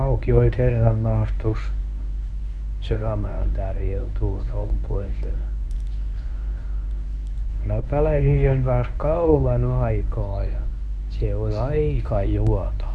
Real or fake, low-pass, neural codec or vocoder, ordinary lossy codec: fake; none; codec, 24 kHz, 0.9 kbps, WavTokenizer, medium speech release version 1; none